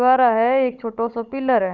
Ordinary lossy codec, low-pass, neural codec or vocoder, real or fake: Opus, 64 kbps; 7.2 kHz; codec, 16 kHz, 16 kbps, FunCodec, trained on Chinese and English, 50 frames a second; fake